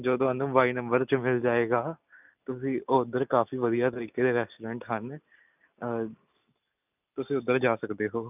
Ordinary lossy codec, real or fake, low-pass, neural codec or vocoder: none; real; 3.6 kHz; none